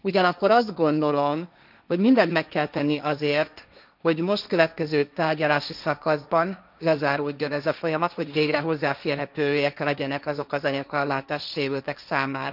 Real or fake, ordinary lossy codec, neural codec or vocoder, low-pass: fake; none; codec, 16 kHz, 1.1 kbps, Voila-Tokenizer; 5.4 kHz